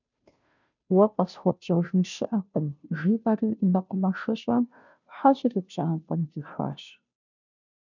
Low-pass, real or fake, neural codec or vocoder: 7.2 kHz; fake; codec, 16 kHz, 0.5 kbps, FunCodec, trained on Chinese and English, 25 frames a second